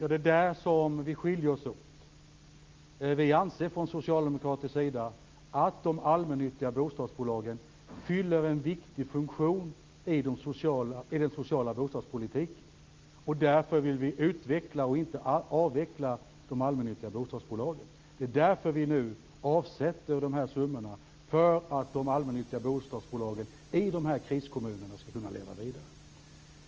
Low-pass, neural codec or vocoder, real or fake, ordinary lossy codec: 7.2 kHz; none; real; Opus, 24 kbps